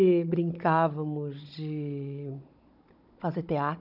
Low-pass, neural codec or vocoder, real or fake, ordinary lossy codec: 5.4 kHz; codec, 16 kHz, 16 kbps, FunCodec, trained on Chinese and English, 50 frames a second; fake; none